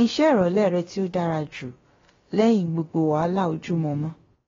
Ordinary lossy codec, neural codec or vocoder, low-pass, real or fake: AAC, 24 kbps; codec, 16 kHz, about 1 kbps, DyCAST, with the encoder's durations; 7.2 kHz; fake